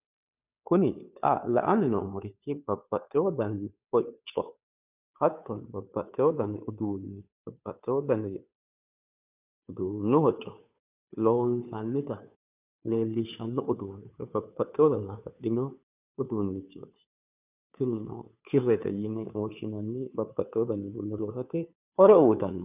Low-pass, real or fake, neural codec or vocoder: 3.6 kHz; fake; codec, 16 kHz, 2 kbps, FunCodec, trained on Chinese and English, 25 frames a second